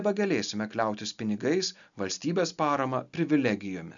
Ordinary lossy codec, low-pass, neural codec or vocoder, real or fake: MP3, 96 kbps; 7.2 kHz; none; real